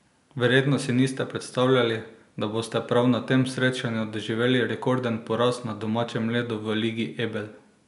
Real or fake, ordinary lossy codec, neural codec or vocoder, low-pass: real; none; none; 10.8 kHz